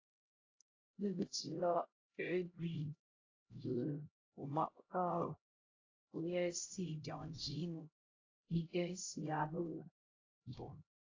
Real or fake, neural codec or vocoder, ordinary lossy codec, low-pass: fake; codec, 16 kHz, 0.5 kbps, X-Codec, HuBERT features, trained on LibriSpeech; AAC, 32 kbps; 7.2 kHz